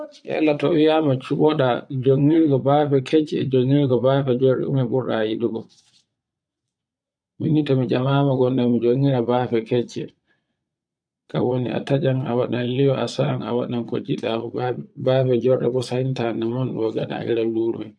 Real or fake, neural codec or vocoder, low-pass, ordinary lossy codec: fake; vocoder, 22.05 kHz, 80 mel bands, Vocos; 9.9 kHz; none